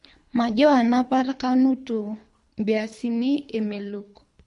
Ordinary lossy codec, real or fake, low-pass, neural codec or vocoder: MP3, 64 kbps; fake; 10.8 kHz; codec, 24 kHz, 3 kbps, HILCodec